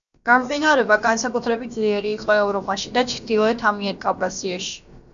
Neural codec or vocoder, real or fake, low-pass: codec, 16 kHz, about 1 kbps, DyCAST, with the encoder's durations; fake; 7.2 kHz